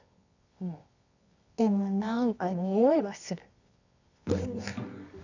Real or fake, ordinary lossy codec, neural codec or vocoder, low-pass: fake; none; codec, 24 kHz, 0.9 kbps, WavTokenizer, medium music audio release; 7.2 kHz